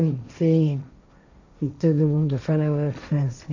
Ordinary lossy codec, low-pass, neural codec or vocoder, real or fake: none; 7.2 kHz; codec, 16 kHz, 1.1 kbps, Voila-Tokenizer; fake